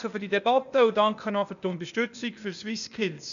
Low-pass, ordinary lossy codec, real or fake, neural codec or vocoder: 7.2 kHz; none; fake; codec, 16 kHz, 0.8 kbps, ZipCodec